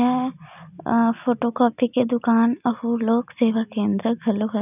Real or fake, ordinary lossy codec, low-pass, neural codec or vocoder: real; none; 3.6 kHz; none